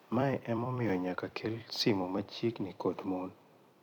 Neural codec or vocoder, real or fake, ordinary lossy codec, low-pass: vocoder, 44.1 kHz, 128 mel bands every 256 samples, BigVGAN v2; fake; none; 19.8 kHz